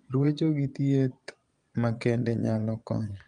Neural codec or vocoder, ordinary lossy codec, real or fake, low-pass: vocoder, 22.05 kHz, 80 mel bands, Vocos; Opus, 32 kbps; fake; 9.9 kHz